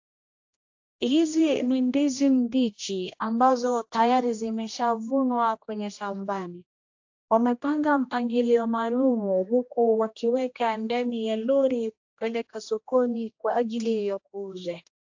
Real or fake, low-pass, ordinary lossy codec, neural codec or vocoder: fake; 7.2 kHz; AAC, 48 kbps; codec, 16 kHz, 1 kbps, X-Codec, HuBERT features, trained on general audio